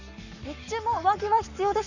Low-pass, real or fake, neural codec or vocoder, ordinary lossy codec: 7.2 kHz; fake; codec, 44.1 kHz, 7.8 kbps, Pupu-Codec; none